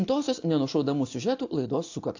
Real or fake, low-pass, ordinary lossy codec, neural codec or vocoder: real; 7.2 kHz; AAC, 48 kbps; none